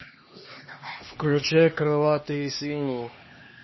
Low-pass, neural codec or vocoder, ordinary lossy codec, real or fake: 7.2 kHz; codec, 16 kHz, 2 kbps, X-Codec, HuBERT features, trained on LibriSpeech; MP3, 24 kbps; fake